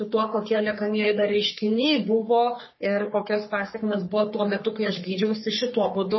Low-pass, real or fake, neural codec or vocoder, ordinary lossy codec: 7.2 kHz; fake; codec, 44.1 kHz, 3.4 kbps, Pupu-Codec; MP3, 24 kbps